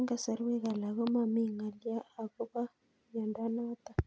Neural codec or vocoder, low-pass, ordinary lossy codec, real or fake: none; none; none; real